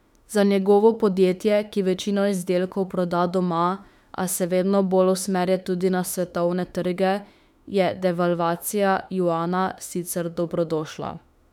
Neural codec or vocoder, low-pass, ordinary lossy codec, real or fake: autoencoder, 48 kHz, 32 numbers a frame, DAC-VAE, trained on Japanese speech; 19.8 kHz; none; fake